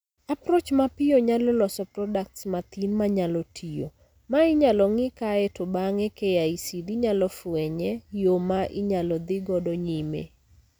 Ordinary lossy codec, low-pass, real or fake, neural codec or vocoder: none; none; real; none